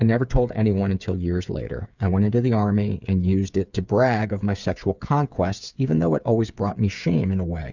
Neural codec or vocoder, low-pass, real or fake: codec, 16 kHz, 8 kbps, FreqCodec, smaller model; 7.2 kHz; fake